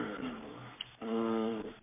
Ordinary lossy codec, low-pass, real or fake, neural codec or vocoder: MP3, 32 kbps; 3.6 kHz; fake; codec, 44.1 kHz, 7.8 kbps, Pupu-Codec